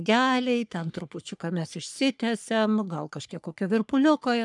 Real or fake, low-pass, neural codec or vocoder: fake; 10.8 kHz; codec, 44.1 kHz, 3.4 kbps, Pupu-Codec